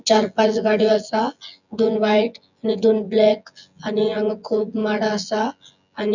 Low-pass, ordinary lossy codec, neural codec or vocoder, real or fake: 7.2 kHz; none; vocoder, 24 kHz, 100 mel bands, Vocos; fake